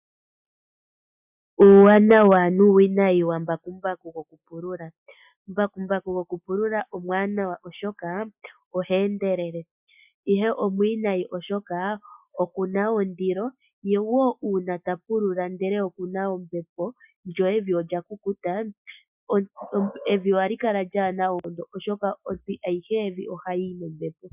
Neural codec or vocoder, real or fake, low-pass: none; real; 3.6 kHz